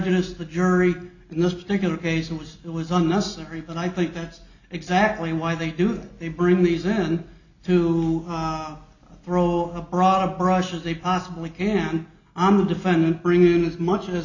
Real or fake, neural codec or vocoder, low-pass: real; none; 7.2 kHz